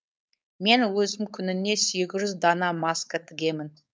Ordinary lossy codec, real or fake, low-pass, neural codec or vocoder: none; fake; none; codec, 16 kHz, 4.8 kbps, FACodec